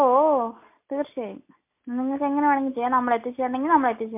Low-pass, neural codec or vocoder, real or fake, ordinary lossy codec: 3.6 kHz; none; real; MP3, 24 kbps